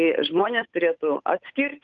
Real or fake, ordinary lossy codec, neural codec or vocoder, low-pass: fake; Opus, 24 kbps; codec, 16 kHz, 8 kbps, FunCodec, trained on Chinese and English, 25 frames a second; 7.2 kHz